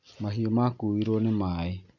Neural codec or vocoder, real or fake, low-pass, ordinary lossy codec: none; real; 7.2 kHz; none